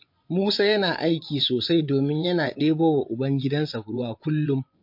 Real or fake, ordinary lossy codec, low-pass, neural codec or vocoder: fake; MP3, 32 kbps; 5.4 kHz; vocoder, 24 kHz, 100 mel bands, Vocos